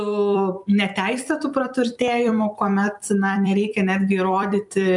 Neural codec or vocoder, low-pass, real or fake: vocoder, 44.1 kHz, 128 mel bands every 256 samples, BigVGAN v2; 10.8 kHz; fake